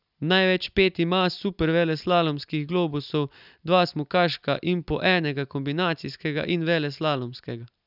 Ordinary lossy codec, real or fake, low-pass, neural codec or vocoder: none; real; 5.4 kHz; none